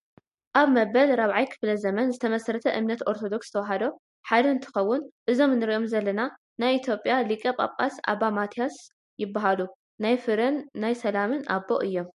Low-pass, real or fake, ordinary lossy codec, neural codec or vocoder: 14.4 kHz; real; MP3, 48 kbps; none